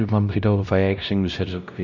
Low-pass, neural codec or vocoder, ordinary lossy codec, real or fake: 7.2 kHz; codec, 16 kHz, 0.5 kbps, X-Codec, WavLM features, trained on Multilingual LibriSpeech; none; fake